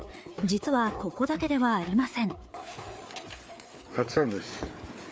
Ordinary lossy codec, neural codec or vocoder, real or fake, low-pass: none; codec, 16 kHz, 4 kbps, FreqCodec, larger model; fake; none